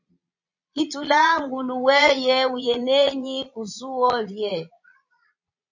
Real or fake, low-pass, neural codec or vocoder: fake; 7.2 kHz; vocoder, 22.05 kHz, 80 mel bands, Vocos